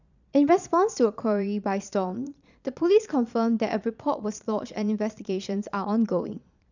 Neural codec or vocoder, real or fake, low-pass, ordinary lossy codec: vocoder, 22.05 kHz, 80 mel bands, Vocos; fake; 7.2 kHz; none